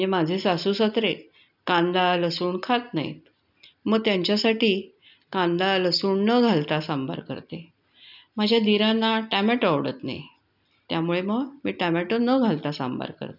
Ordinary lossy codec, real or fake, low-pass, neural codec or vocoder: none; real; 5.4 kHz; none